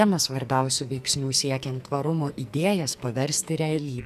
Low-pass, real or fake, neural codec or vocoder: 14.4 kHz; fake; codec, 44.1 kHz, 2.6 kbps, SNAC